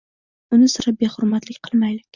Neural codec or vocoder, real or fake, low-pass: none; real; 7.2 kHz